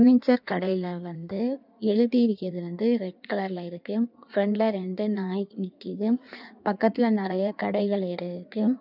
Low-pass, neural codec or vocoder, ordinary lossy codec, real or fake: 5.4 kHz; codec, 16 kHz in and 24 kHz out, 1.1 kbps, FireRedTTS-2 codec; none; fake